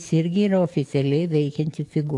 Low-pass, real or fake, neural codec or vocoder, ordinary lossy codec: 10.8 kHz; fake; codec, 44.1 kHz, 7.8 kbps, DAC; AAC, 48 kbps